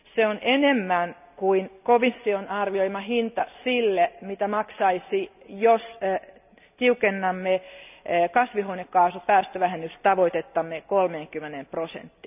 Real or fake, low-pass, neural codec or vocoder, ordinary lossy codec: real; 3.6 kHz; none; none